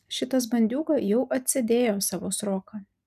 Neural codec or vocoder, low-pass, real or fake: none; 14.4 kHz; real